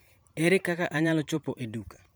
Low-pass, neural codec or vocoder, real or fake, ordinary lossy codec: none; vocoder, 44.1 kHz, 128 mel bands every 512 samples, BigVGAN v2; fake; none